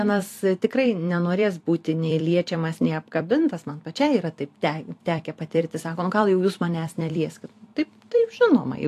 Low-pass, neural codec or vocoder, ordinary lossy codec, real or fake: 14.4 kHz; vocoder, 44.1 kHz, 128 mel bands every 256 samples, BigVGAN v2; AAC, 64 kbps; fake